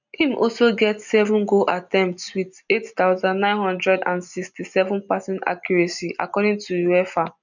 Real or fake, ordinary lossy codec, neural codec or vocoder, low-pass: real; none; none; 7.2 kHz